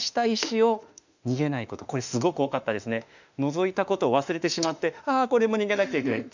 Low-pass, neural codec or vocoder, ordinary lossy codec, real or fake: 7.2 kHz; autoencoder, 48 kHz, 32 numbers a frame, DAC-VAE, trained on Japanese speech; none; fake